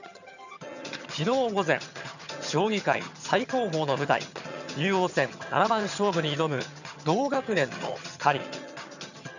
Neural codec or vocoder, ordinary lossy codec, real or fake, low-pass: vocoder, 22.05 kHz, 80 mel bands, HiFi-GAN; none; fake; 7.2 kHz